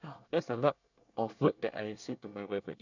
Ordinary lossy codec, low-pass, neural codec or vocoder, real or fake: none; 7.2 kHz; codec, 24 kHz, 1 kbps, SNAC; fake